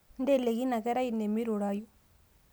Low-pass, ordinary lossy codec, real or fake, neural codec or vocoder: none; none; real; none